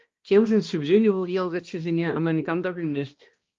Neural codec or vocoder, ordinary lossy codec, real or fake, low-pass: codec, 16 kHz, 1 kbps, X-Codec, HuBERT features, trained on balanced general audio; Opus, 32 kbps; fake; 7.2 kHz